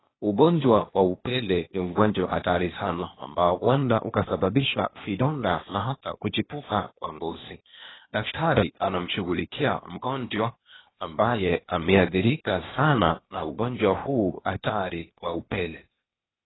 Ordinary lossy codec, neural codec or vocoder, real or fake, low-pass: AAC, 16 kbps; codec, 16 kHz, 0.8 kbps, ZipCodec; fake; 7.2 kHz